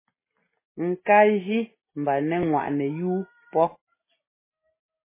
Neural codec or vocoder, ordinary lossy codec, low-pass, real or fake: none; MP3, 16 kbps; 3.6 kHz; real